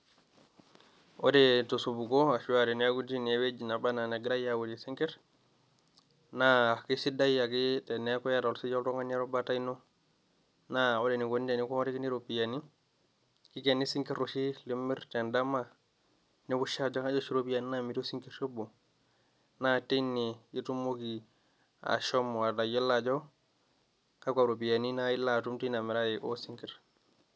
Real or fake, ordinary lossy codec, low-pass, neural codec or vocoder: real; none; none; none